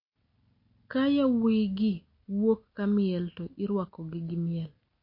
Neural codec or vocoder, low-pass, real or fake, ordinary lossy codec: none; 5.4 kHz; real; MP3, 32 kbps